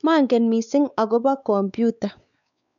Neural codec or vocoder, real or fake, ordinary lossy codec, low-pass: codec, 16 kHz, 4 kbps, X-Codec, WavLM features, trained on Multilingual LibriSpeech; fake; none; 7.2 kHz